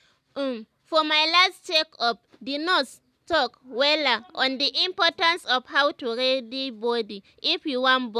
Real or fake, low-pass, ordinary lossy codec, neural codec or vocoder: real; 14.4 kHz; none; none